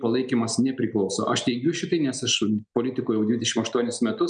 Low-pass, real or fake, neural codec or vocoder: 9.9 kHz; real; none